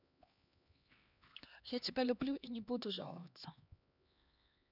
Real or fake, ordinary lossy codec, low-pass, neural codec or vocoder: fake; none; 5.4 kHz; codec, 16 kHz, 2 kbps, X-Codec, HuBERT features, trained on LibriSpeech